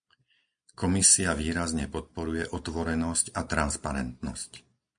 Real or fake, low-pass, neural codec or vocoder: real; 9.9 kHz; none